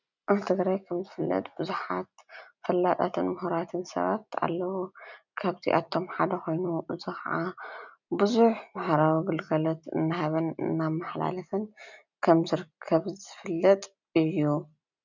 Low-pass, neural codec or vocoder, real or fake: 7.2 kHz; none; real